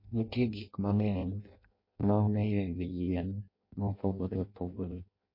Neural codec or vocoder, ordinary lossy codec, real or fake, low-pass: codec, 16 kHz in and 24 kHz out, 0.6 kbps, FireRedTTS-2 codec; MP3, 32 kbps; fake; 5.4 kHz